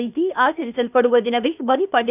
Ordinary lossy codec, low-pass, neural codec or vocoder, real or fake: none; 3.6 kHz; codec, 16 kHz, 0.8 kbps, ZipCodec; fake